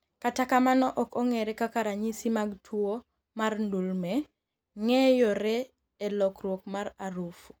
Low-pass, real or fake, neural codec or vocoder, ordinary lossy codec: none; real; none; none